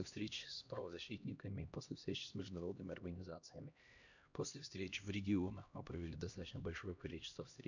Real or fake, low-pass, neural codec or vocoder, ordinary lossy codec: fake; 7.2 kHz; codec, 16 kHz, 1 kbps, X-Codec, HuBERT features, trained on LibriSpeech; none